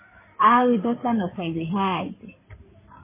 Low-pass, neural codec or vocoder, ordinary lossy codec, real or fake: 3.6 kHz; codec, 16 kHz in and 24 kHz out, 2.2 kbps, FireRedTTS-2 codec; MP3, 16 kbps; fake